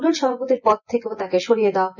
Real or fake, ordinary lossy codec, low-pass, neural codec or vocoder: fake; none; 7.2 kHz; vocoder, 24 kHz, 100 mel bands, Vocos